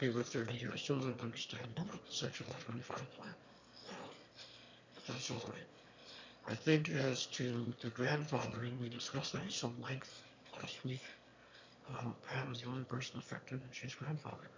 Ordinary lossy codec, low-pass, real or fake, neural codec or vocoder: MP3, 64 kbps; 7.2 kHz; fake; autoencoder, 22.05 kHz, a latent of 192 numbers a frame, VITS, trained on one speaker